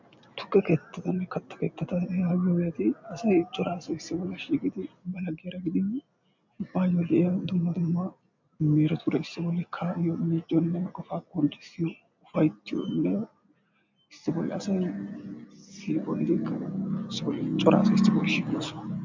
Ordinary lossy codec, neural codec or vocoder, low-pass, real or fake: MP3, 64 kbps; none; 7.2 kHz; real